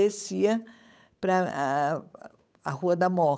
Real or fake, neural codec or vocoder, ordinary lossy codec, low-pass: fake; codec, 16 kHz, 8 kbps, FunCodec, trained on Chinese and English, 25 frames a second; none; none